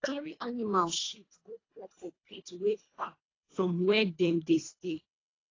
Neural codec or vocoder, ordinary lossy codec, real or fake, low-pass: codec, 24 kHz, 1.5 kbps, HILCodec; AAC, 32 kbps; fake; 7.2 kHz